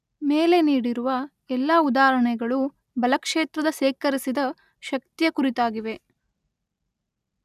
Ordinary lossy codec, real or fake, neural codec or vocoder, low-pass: none; real; none; 14.4 kHz